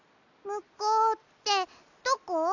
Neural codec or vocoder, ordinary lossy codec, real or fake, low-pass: none; none; real; 7.2 kHz